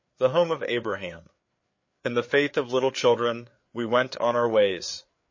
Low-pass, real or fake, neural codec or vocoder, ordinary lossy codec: 7.2 kHz; fake; codec, 16 kHz, 4 kbps, FreqCodec, larger model; MP3, 32 kbps